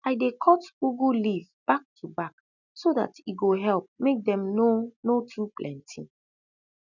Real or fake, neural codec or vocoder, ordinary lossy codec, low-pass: real; none; none; 7.2 kHz